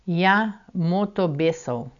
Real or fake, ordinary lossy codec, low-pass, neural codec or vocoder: real; none; 7.2 kHz; none